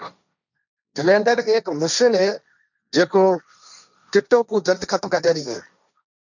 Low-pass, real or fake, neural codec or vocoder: 7.2 kHz; fake; codec, 16 kHz, 1.1 kbps, Voila-Tokenizer